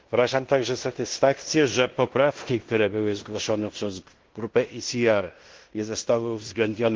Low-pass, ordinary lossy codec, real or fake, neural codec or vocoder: 7.2 kHz; Opus, 16 kbps; fake; codec, 16 kHz in and 24 kHz out, 0.9 kbps, LongCat-Audio-Codec, fine tuned four codebook decoder